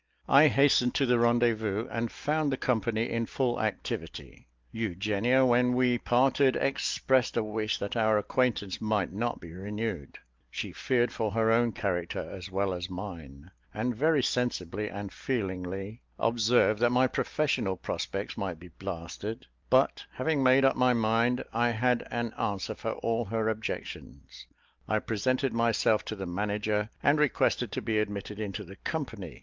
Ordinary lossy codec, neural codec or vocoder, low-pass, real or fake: Opus, 32 kbps; none; 7.2 kHz; real